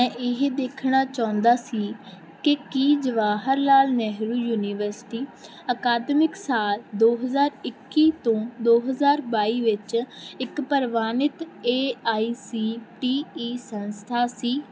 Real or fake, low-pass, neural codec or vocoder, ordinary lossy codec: real; none; none; none